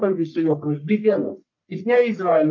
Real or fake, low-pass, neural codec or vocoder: fake; 7.2 kHz; codec, 44.1 kHz, 1.7 kbps, Pupu-Codec